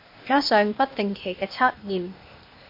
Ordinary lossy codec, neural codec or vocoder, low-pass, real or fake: MP3, 32 kbps; codec, 16 kHz, 0.8 kbps, ZipCodec; 5.4 kHz; fake